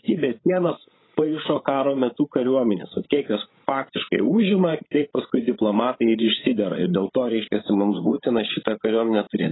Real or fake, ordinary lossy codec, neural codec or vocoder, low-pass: fake; AAC, 16 kbps; codec, 16 kHz, 16 kbps, FreqCodec, larger model; 7.2 kHz